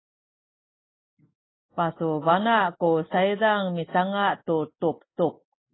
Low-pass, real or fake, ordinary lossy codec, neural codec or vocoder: 7.2 kHz; real; AAC, 16 kbps; none